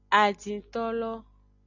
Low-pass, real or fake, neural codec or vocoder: 7.2 kHz; real; none